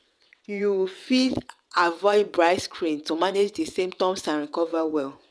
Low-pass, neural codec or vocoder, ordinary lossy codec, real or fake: none; vocoder, 22.05 kHz, 80 mel bands, WaveNeXt; none; fake